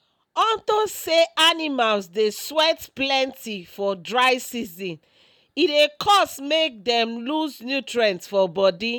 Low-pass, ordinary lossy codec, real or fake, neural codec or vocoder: 19.8 kHz; none; real; none